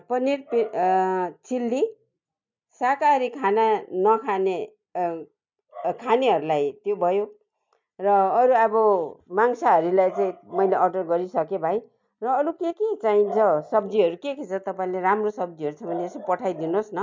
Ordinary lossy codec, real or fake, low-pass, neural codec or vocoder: MP3, 64 kbps; real; 7.2 kHz; none